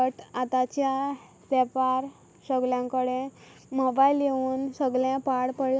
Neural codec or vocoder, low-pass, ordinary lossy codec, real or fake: none; none; none; real